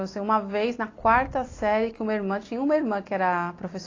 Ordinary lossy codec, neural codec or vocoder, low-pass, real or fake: AAC, 32 kbps; none; 7.2 kHz; real